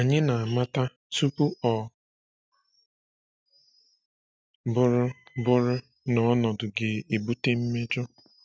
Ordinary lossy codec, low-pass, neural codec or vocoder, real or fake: none; none; none; real